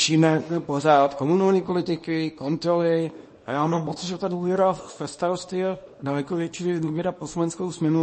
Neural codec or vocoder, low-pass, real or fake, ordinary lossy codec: codec, 24 kHz, 0.9 kbps, WavTokenizer, small release; 10.8 kHz; fake; MP3, 32 kbps